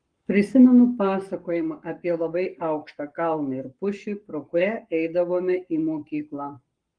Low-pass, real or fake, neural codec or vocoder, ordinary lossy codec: 9.9 kHz; fake; codec, 44.1 kHz, 7.8 kbps, Pupu-Codec; Opus, 24 kbps